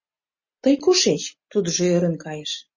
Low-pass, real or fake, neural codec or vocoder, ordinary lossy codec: 7.2 kHz; real; none; MP3, 32 kbps